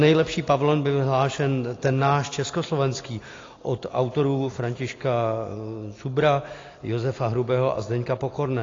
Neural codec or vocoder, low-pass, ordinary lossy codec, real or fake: none; 7.2 kHz; AAC, 32 kbps; real